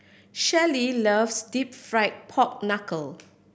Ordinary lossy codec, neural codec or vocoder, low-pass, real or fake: none; none; none; real